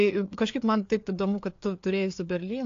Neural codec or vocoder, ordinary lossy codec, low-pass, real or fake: codec, 16 kHz, 4 kbps, FreqCodec, larger model; AAC, 48 kbps; 7.2 kHz; fake